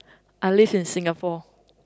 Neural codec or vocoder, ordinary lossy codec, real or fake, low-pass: none; none; real; none